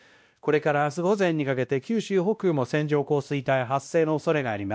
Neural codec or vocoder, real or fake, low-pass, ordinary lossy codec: codec, 16 kHz, 1 kbps, X-Codec, WavLM features, trained on Multilingual LibriSpeech; fake; none; none